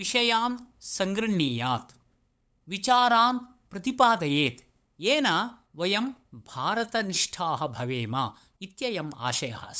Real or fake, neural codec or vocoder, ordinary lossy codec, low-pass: fake; codec, 16 kHz, 8 kbps, FunCodec, trained on LibriTTS, 25 frames a second; none; none